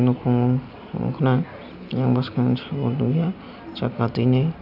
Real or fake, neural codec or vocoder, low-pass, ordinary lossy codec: real; none; 5.4 kHz; none